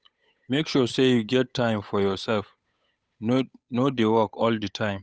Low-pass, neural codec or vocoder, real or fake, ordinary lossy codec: none; codec, 16 kHz, 8 kbps, FunCodec, trained on Chinese and English, 25 frames a second; fake; none